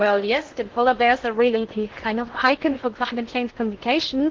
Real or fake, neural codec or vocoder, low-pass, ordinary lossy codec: fake; codec, 16 kHz in and 24 kHz out, 0.6 kbps, FocalCodec, streaming, 2048 codes; 7.2 kHz; Opus, 16 kbps